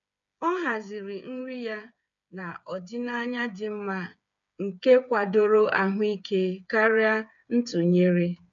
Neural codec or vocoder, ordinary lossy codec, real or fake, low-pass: codec, 16 kHz, 8 kbps, FreqCodec, smaller model; none; fake; 7.2 kHz